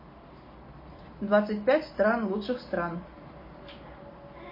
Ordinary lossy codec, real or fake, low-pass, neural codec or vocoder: MP3, 24 kbps; real; 5.4 kHz; none